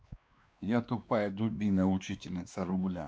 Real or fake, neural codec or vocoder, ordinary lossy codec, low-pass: fake; codec, 16 kHz, 2 kbps, X-Codec, WavLM features, trained on Multilingual LibriSpeech; none; none